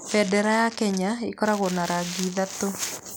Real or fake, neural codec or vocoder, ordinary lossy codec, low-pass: real; none; none; none